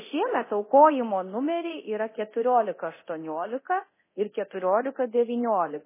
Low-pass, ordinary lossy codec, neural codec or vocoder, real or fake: 3.6 kHz; MP3, 16 kbps; codec, 24 kHz, 0.9 kbps, DualCodec; fake